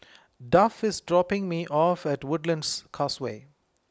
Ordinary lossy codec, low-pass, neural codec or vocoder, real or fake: none; none; none; real